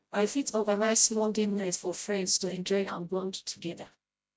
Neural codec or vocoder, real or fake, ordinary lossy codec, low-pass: codec, 16 kHz, 0.5 kbps, FreqCodec, smaller model; fake; none; none